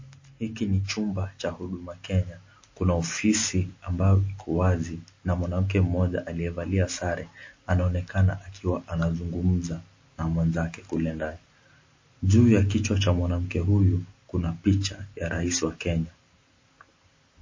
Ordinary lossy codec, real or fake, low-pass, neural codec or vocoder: MP3, 32 kbps; real; 7.2 kHz; none